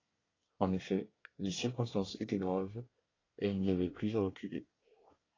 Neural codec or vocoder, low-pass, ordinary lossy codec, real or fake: codec, 24 kHz, 1 kbps, SNAC; 7.2 kHz; AAC, 32 kbps; fake